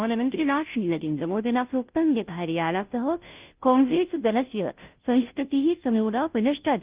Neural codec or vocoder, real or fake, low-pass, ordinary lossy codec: codec, 16 kHz, 0.5 kbps, FunCodec, trained on Chinese and English, 25 frames a second; fake; 3.6 kHz; Opus, 24 kbps